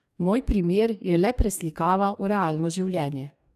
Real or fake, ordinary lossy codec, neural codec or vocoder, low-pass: fake; none; codec, 44.1 kHz, 2.6 kbps, DAC; 14.4 kHz